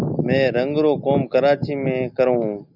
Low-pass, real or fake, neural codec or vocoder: 5.4 kHz; real; none